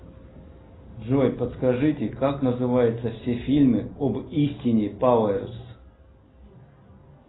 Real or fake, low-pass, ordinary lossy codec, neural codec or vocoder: real; 7.2 kHz; AAC, 16 kbps; none